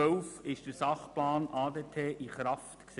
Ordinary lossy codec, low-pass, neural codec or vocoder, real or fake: none; 10.8 kHz; none; real